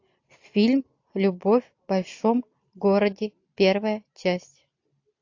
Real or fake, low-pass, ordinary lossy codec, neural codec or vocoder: real; 7.2 kHz; AAC, 48 kbps; none